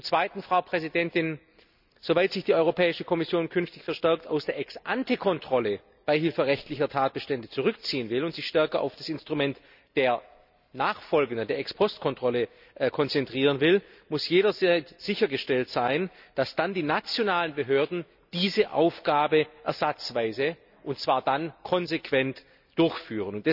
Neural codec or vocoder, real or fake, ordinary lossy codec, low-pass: none; real; none; 5.4 kHz